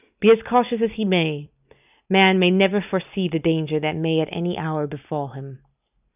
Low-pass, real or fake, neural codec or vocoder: 3.6 kHz; real; none